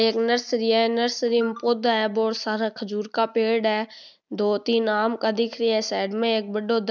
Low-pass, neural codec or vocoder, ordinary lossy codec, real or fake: 7.2 kHz; none; none; real